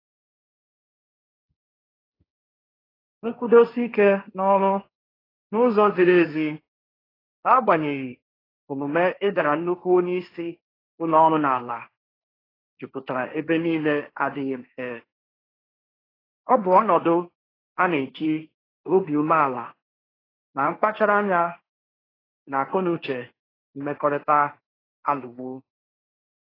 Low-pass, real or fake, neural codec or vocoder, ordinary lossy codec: 5.4 kHz; fake; codec, 16 kHz, 1.1 kbps, Voila-Tokenizer; AAC, 24 kbps